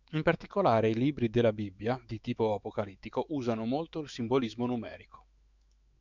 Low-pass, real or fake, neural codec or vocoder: 7.2 kHz; fake; autoencoder, 48 kHz, 128 numbers a frame, DAC-VAE, trained on Japanese speech